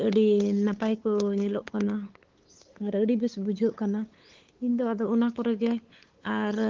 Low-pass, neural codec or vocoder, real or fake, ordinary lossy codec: 7.2 kHz; codec, 16 kHz, 8 kbps, FunCodec, trained on LibriTTS, 25 frames a second; fake; Opus, 16 kbps